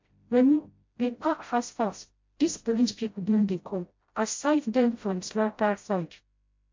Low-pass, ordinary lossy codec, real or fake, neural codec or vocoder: 7.2 kHz; MP3, 48 kbps; fake; codec, 16 kHz, 0.5 kbps, FreqCodec, smaller model